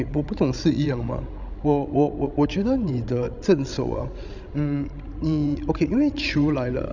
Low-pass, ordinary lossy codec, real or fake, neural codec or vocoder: 7.2 kHz; none; fake; codec, 16 kHz, 16 kbps, FreqCodec, larger model